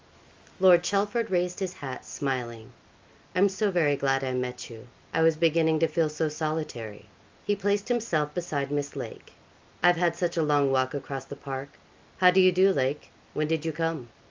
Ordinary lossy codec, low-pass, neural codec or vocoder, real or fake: Opus, 32 kbps; 7.2 kHz; none; real